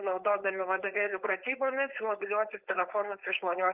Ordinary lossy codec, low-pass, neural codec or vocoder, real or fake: Opus, 32 kbps; 3.6 kHz; codec, 16 kHz, 4.8 kbps, FACodec; fake